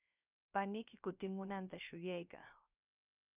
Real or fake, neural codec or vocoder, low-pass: fake; codec, 16 kHz, 0.3 kbps, FocalCodec; 3.6 kHz